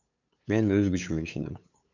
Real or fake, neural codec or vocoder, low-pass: fake; codec, 16 kHz, 8 kbps, FunCodec, trained on LibriTTS, 25 frames a second; 7.2 kHz